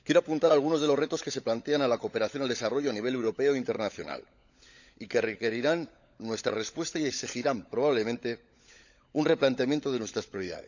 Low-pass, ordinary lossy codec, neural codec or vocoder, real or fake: 7.2 kHz; none; codec, 16 kHz, 16 kbps, FunCodec, trained on LibriTTS, 50 frames a second; fake